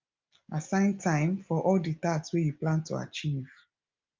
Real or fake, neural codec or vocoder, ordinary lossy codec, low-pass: real; none; Opus, 24 kbps; 7.2 kHz